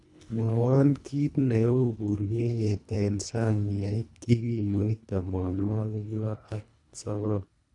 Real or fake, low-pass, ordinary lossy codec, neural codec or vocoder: fake; 10.8 kHz; none; codec, 24 kHz, 1.5 kbps, HILCodec